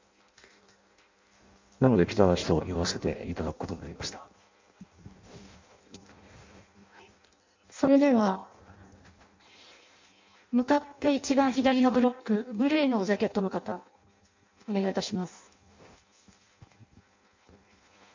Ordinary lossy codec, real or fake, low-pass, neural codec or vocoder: MP3, 64 kbps; fake; 7.2 kHz; codec, 16 kHz in and 24 kHz out, 0.6 kbps, FireRedTTS-2 codec